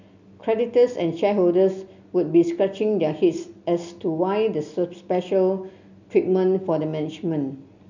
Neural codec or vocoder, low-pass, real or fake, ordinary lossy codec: none; 7.2 kHz; real; none